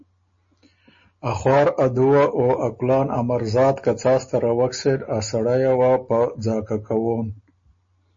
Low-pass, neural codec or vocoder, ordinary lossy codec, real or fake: 7.2 kHz; none; MP3, 32 kbps; real